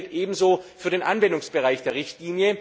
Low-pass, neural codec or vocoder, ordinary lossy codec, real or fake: none; none; none; real